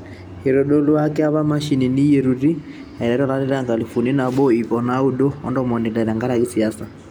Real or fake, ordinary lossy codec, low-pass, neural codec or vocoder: real; none; 19.8 kHz; none